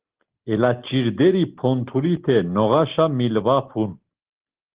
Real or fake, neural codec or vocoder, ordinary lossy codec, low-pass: real; none; Opus, 16 kbps; 3.6 kHz